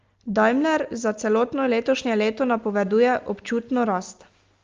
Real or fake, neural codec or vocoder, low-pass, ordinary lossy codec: real; none; 7.2 kHz; Opus, 32 kbps